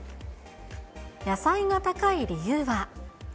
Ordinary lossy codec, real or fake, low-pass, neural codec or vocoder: none; real; none; none